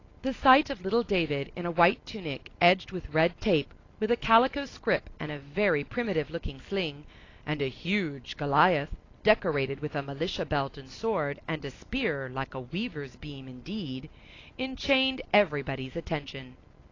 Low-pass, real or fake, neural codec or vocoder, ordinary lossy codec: 7.2 kHz; real; none; AAC, 32 kbps